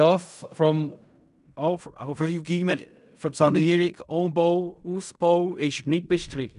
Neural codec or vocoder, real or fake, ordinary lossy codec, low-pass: codec, 16 kHz in and 24 kHz out, 0.4 kbps, LongCat-Audio-Codec, fine tuned four codebook decoder; fake; none; 10.8 kHz